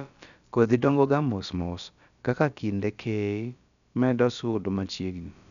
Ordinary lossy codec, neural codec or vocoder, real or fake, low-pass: none; codec, 16 kHz, about 1 kbps, DyCAST, with the encoder's durations; fake; 7.2 kHz